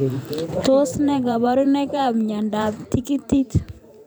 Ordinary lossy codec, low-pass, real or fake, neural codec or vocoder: none; none; fake; vocoder, 44.1 kHz, 128 mel bands every 512 samples, BigVGAN v2